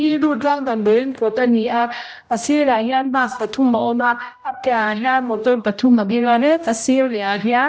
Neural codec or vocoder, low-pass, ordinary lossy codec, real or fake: codec, 16 kHz, 0.5 kbps, X-Codec, HuBERT features, trained on general audio; none; none; fake